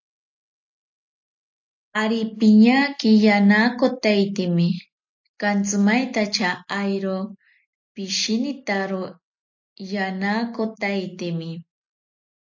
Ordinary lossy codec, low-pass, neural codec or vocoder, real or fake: AAC, 48 kbps; 7.2 kHz; none; real